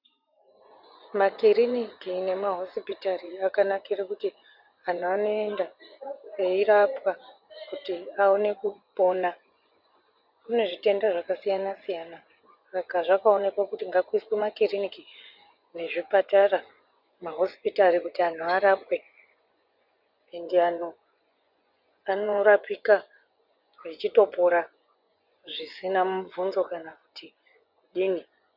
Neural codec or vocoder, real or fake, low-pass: vocoder, 24 kHz, 100 mel bands, Vocos; fake; 5.4 kHz